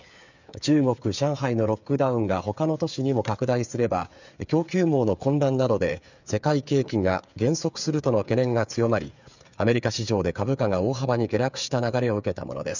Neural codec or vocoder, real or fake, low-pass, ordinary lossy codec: codec, 16 kHz, 8 kbps, FreqCodec, smaller model; fake; 7.2 kHz; none